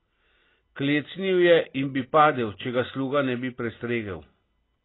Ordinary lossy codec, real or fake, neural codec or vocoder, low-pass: AAC, 16 kbps; real; none; 7.2 kHz